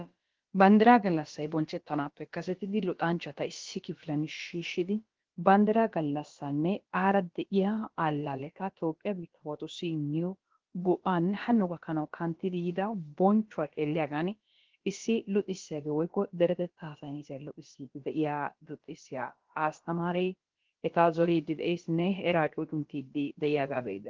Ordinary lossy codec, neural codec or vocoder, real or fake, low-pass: Opus, 16 kbps; codec, 16 kHz, about 1 kbps, DyCAST, with the encoder's durations; fake; 7.2 kHz